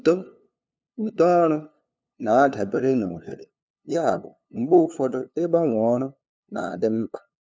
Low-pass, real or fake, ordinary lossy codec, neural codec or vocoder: none; fake; none; codec, 16 kHz, 2 kbps, FunCodec, trained on LibriTTS, 25 frames a second